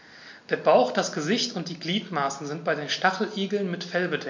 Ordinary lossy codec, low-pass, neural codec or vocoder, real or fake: MP3, 48 kbps; 7.2 kHz; none; real